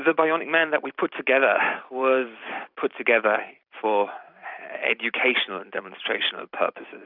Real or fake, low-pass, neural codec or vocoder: fake; 5.4 kHz; vocoder, 44.1 kHz, 128 mel bands every 256 samples, BigVGAN v2